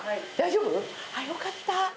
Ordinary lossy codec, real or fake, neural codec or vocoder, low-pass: none; real; none; none